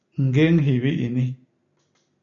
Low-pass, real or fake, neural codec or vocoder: 7.2 kHz; real; none